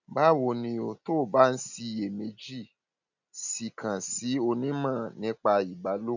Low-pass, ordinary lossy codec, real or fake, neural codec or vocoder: 7.2 kHz; none; real; none